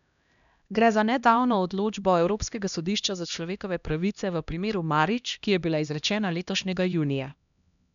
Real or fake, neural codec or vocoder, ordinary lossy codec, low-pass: fake; codec, 16 kHz, 1 kbps, X-Codec, HuBERT features, trained on LibriSpeech; none; 7.2 kHz